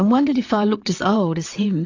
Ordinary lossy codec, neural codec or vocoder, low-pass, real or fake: AAC, 48 kbps; vocoder, 22.05 kHz, 80 mel bands, WaveNeXt; 7.2 kHz; fake